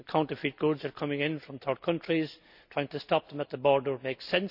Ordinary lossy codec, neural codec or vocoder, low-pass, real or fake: none; none; 5.4 kHz; real